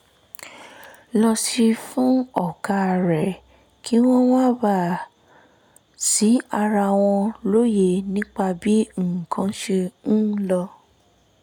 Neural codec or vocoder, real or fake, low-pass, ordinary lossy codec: none; real; 19.8 kHz; none